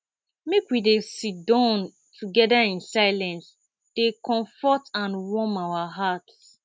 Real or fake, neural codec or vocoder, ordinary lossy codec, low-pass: real; none; none; none